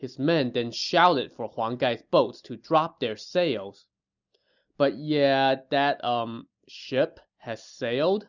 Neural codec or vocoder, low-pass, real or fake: none; 7.2 kHz; real